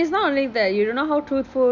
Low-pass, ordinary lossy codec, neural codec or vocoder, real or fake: 7.2 kHz; none; none; real